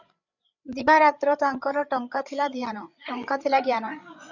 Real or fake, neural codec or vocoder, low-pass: fake; codec, 16 kHz, 8 kbps, FreqCodec, larger model; 7.2 kHz